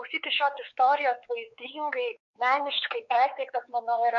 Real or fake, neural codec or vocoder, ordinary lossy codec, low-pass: fake; codec, 16 kHz, 4 kbps, X-Codec, WavLM features, trained on Multilingual LibriSpeech; MP3, 48 kbps; 7.2 kHz